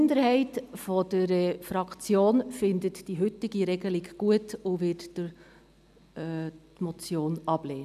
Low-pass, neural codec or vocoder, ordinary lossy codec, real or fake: 14.4 kHz; none; none; real